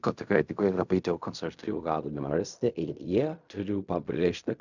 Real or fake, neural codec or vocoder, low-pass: fake; codec, 16 kHz in and 24 kHz out, 0.4 kbps, LongCat-Audio-Codec, fine tuned four codebook decoder; 7.2 kHz